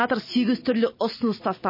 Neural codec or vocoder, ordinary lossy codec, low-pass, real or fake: none; MP3, 24 kbps; 5.4 kHz; real